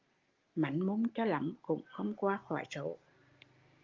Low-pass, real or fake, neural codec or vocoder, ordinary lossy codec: 7.2 kHz; real; none; Opus, 32 kbps